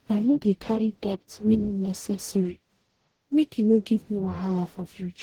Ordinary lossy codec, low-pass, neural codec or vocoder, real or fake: Opus, 16 kbps; 19.8 kHz; codec, 44.1 kHz, 0.9 kbps, DAC; fake